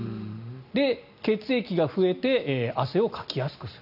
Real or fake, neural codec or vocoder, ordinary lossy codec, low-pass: real; none; none; 5.4 kHz